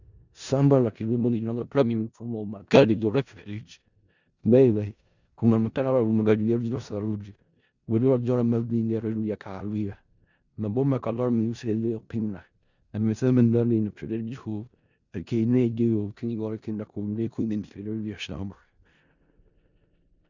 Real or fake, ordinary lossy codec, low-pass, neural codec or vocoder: fake; Opus, 64 kbps; 7.2 kHz; codec, 16 kHz in and 24 kHz out, 0.4 kbps, LongCat-Audio-Codec, four codebook decoder